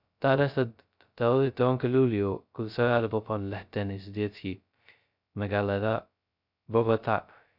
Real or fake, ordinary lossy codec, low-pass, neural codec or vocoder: fake; none; 5.4 kHz; codec, 16 kHz, 0.2 kbps, FocalCodec